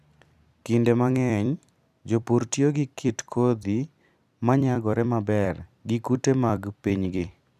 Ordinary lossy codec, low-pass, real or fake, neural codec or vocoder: none; 14.4 kHz; fake; vocoder, 44.1 kHz, 128 mel bands every 256 samples, BigVGAN v2